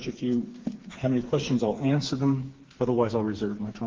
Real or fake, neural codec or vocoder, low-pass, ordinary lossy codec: fake; codec, 16 kHz, 4 kbps, FreqCodec, smaller model; 7.2 kHz; Opus, 16 kbps